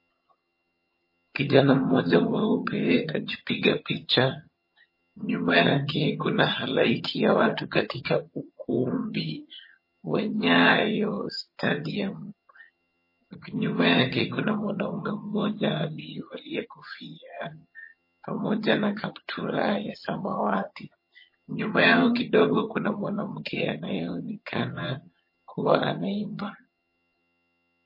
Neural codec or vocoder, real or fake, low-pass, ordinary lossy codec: vocoder, 22.05 kHz, 80 mel bands, HiFi-GAN; fake; 5.4 kHz; MP3, 24 kbps